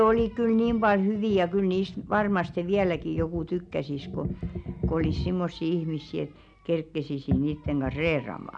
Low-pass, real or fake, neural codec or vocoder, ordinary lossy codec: 9.9 kHz; real; none; none